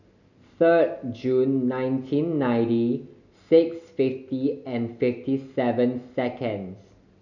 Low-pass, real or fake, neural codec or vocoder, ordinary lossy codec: 7.2 kHz; real; none; none